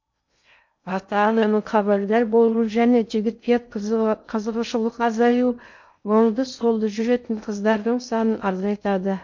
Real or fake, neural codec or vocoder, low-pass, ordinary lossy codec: fake; codec, 16 kHz in and 24 kHz out, 0.6 kbps, FocalCodec, streaming, 2048 codes; 7.2 kHz; MP3, 48 kbps